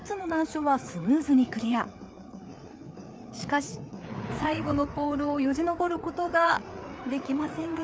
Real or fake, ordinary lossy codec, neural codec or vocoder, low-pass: fake; none; codec, 16 kHz, 4 kbps, FreqCodec, larger model; none